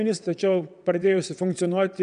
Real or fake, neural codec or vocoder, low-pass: fake; vocoder, 22.05 kHz, 80 mel bands, WaveNeXt; 9.9 kHz